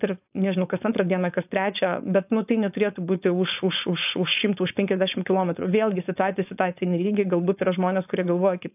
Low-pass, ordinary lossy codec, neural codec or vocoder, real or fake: 3.6 kHz; AAC, 32 kbps; codec, 16 kHz, 4.8 kbps, FACodec; fake